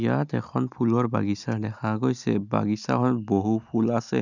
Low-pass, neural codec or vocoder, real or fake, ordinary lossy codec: 7.2 kHz; none; real; none